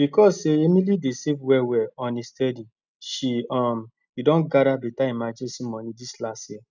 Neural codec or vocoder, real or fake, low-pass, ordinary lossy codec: none; real; 7.2 kHz; none